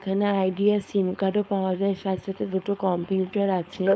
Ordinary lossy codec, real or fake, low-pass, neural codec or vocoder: none; fake; none; codec, 16 kHz, 4.8 kbps, FACodec